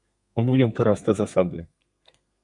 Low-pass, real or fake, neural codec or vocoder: 10.8 kHz; fake; codec, 32 kHz, 1.9 kbps, SNAC